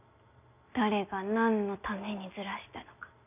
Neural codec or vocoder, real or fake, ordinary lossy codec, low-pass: none; real; none; 3.6 kHz